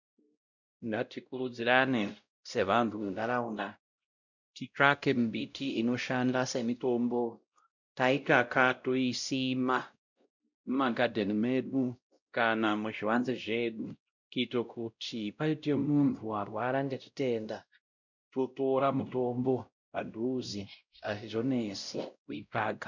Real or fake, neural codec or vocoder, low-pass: fake; codec, 16 kHz, 0.5 kbps, X-Codec, WavLM features, trained on Multilingual LibriSpeech; 7.2 kHz